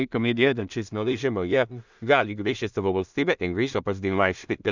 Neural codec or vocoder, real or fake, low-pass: codec, 16 kHz in and 24 kHz out, 0.4 kbps, LongCat-Audio-Codec, two codebook decoder; fake; 7.2 kHz